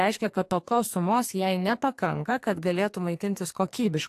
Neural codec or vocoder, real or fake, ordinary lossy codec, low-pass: codec, 44.1 kHz, 2.6 kbps, SNAC; fake; AAC, 64 kbps; 14.4 kHz